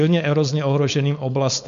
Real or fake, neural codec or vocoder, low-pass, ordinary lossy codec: fake; codec, 16 kHz, 4.8 kbps, FACodec; 7.2 kHz; MP3, 64 kbps